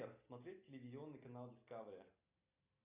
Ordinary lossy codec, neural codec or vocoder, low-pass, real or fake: AAC, 32 kbps; none; 3.6 kHz; real